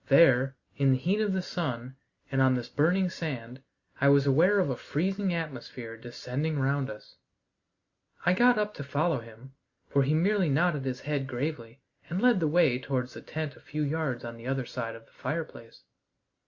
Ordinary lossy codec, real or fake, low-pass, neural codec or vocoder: Opus, 64 kbps; real; 7.2 kHz; none